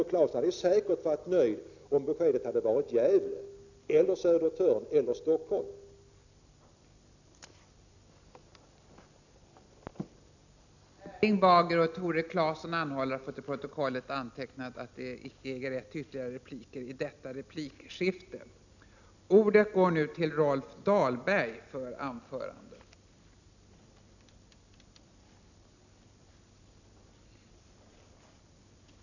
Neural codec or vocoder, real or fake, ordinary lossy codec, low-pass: none; real; none; 7.2 kHz